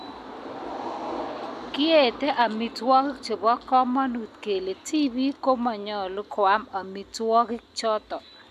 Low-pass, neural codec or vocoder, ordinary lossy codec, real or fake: 14.4 kHz; none; MP3, 96 kbps; real